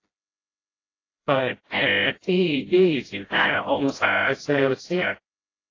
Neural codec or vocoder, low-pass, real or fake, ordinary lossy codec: codec, 16 kHz, 0.5 kbps, FreqCodec, smaller model; 7.2 kHz; fake; AAC, 32 kbps